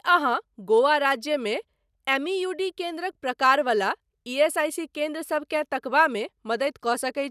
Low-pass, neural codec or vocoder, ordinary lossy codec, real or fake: 14.4 kHz; none; none; real